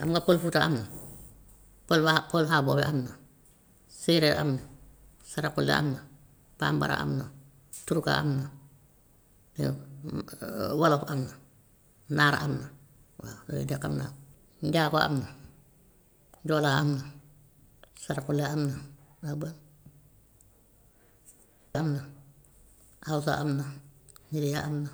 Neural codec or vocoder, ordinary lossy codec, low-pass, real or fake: none; none; none; real